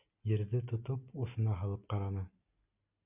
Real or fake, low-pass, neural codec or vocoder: real; 3.6 kHz; none